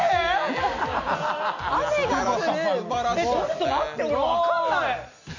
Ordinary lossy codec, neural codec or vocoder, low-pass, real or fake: none; none; 7.2 kHz; real